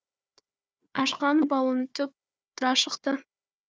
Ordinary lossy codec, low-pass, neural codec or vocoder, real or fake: none; none; codec, 16 kHz, 4 kbps, FunCodec, trained on Chinese and English, 50 frames a second; fake